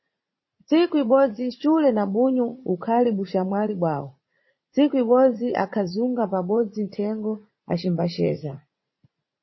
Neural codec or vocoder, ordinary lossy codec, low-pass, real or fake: vocoder, 44.1 kHz, 80 mel bands, Vocos; MP3, 24 kbps; 7.2 kHz; fake